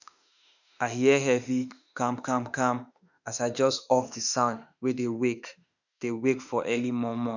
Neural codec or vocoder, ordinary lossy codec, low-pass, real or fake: autoencoder, 48 kHz, 32 numbers a frame, DAC-VAE, trained on Japanese speech; none; 7.2 kHz; fake